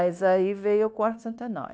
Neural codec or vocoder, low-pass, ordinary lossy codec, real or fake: codec, 16 kHz, 0.9 kbps, LongCat-Audio-Codec; none; none; fake